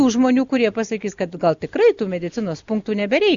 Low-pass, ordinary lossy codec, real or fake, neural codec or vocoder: 7.2 kHz; Opus, 64 kbps; real; none